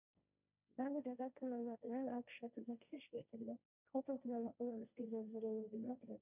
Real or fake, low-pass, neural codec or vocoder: fake; 3.6 kHz; codec, 16 kHz, 1.1 kbps, Voila-Tokenizer